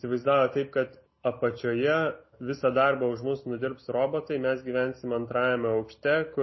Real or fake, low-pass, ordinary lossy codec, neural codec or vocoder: real; 7.2 kHz; MP3, 24 kbps; none